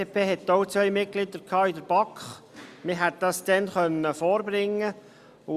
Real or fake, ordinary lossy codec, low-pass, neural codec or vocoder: real; Opus, 64 kbps; 14.4 kHz; none